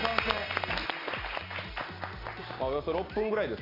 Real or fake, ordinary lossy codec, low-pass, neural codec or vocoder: real; AAC, 24 kbps; 5.4 kHz; none